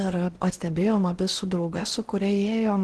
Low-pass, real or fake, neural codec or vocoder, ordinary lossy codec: 10.8 kHz; fake; codec, 16 kHz in and 24 kHz out, 0.8 kbps, FocalCodec, streaming, 65536 codes; Opus, 16 kbps